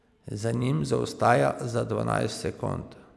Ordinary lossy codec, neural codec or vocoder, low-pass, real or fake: none; none; none; real